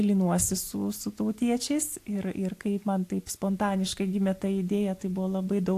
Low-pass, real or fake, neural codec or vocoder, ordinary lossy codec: 14.4 kHz; real; none; AAC, 64 kbps